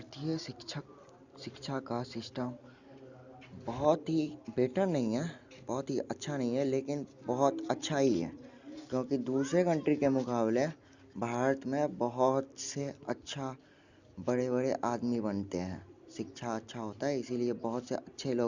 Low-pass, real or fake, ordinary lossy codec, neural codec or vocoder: 7.2 kHz; real; Opus, 64 kbps; none